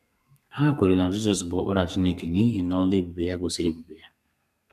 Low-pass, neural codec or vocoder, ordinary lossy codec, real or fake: 14.4 kHz; codec, 32 kHz, 1.9 kbps, SNAC; none; fake